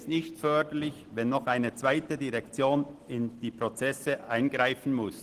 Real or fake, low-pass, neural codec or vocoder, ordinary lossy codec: real; 14.4 kHz; none; Opus, 16 kbps